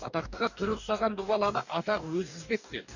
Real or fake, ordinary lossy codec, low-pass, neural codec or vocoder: fake; none; 7.2 kHz; codec, 44.1 kHz, 2.6 kbps, DAC